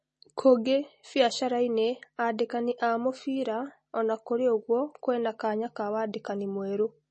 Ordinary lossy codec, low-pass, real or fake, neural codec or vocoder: MP3, 32 kbps; 10.8 kHz; real; none